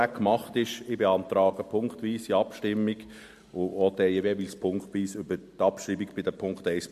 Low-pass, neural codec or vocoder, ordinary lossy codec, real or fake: 14.4 kHz; none; MP3, 64 kbps; real